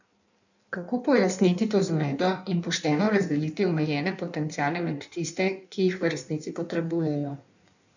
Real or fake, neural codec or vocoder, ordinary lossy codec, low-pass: fake; codec, 16 kHz in and 24 kHz out, 1.1 kbps, FireRedTTS-2 codec; none; 7.2 kHz